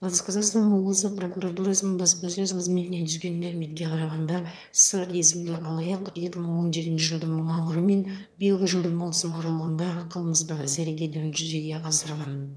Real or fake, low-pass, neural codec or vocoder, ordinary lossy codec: fake; none; autoencoder, 22.05 kHz, a latent of 192 numbers a frame, VITS, trained on one speaker; none